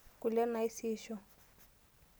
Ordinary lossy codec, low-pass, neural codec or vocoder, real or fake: none; none; none; real